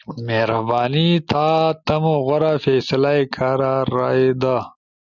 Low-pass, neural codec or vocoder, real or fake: 7.2 kHz; none; real